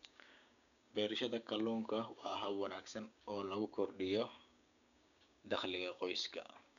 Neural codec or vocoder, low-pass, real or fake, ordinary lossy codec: codec, 16 kHz, 6 kbps, DAC; 7.2 kHz; fake; none